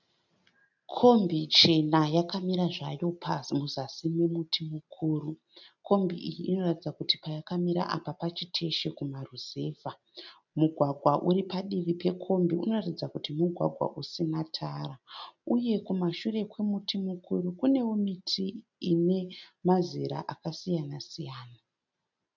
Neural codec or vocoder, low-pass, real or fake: none; 7.2 kHz; real